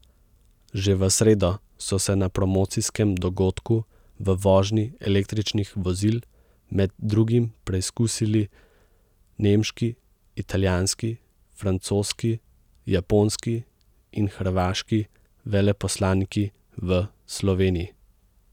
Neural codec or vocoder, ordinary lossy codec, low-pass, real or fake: none; none; 19.8 kHz; real